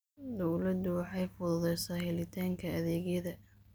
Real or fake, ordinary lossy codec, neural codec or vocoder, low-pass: real; none; none; none